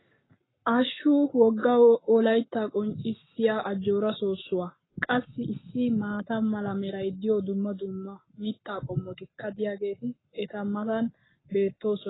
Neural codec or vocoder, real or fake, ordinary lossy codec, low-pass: codec, 44.1 kHz, 7.8 kbps, Pupu-Codec; fake; AAC, 16 kbps; 7.2 kHz